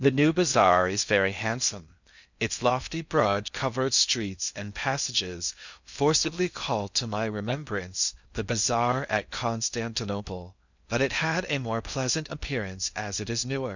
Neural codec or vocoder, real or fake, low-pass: codec, 16 kHz in and 24 kHz out, 0.6 kbps, FocalCodec, streaming, 4096 codes; fake; 7.2 kHz